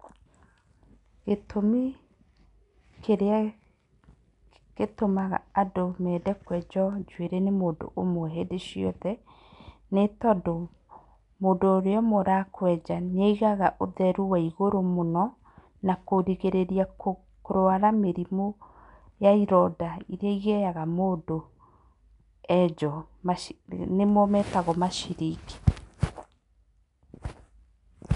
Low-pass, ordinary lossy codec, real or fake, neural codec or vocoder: 10.8 kHz; none; real; none